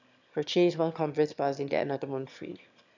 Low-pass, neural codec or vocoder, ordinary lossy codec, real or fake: 7.2 kHz; autoencoder, 22.05 kHz, a latent of 192 numbers a frame, VITS, trained on one speaker; none; fake